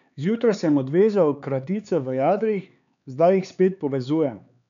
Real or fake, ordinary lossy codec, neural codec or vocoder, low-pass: fake; none; codec, 16 kHz, 4 kbps, X-Codec, HuBERT features, trained on LibriSpeech; 7.2 kHz